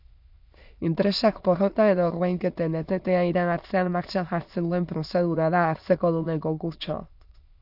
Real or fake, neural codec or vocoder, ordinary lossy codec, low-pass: fake; autoencoder, 22.05 kHz, a latent of 192 numbers a frame, VITS, trained on many speakers; AAC, 48 kbps; 5.4 kHz